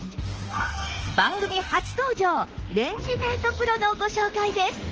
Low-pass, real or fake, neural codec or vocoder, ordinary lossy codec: 7.2 kHz; fake; autoencoder, 48 kHz, 32 numbers a frame, DAC-VAE, trained on Japanese speech; Opus, 16 kbps